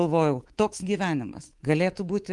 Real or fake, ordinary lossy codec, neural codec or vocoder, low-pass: fake; Opus, 24 kbps; codec, 24 kHz, 3.1 kbps, DualCodec; 10.8 kHz